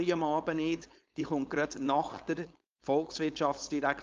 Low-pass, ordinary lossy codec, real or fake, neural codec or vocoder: 7.2 kHz; Opus, 32 kbps; fake; codec, 16 kHz, 4.8 kbps, FACodec